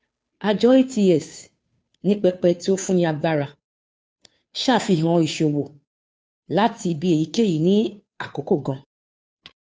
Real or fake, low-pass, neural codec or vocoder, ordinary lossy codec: fake; none; codec, 16 kHz, 2 kbps, FunCodec, trained on Chinese and English, 25 frames a second; none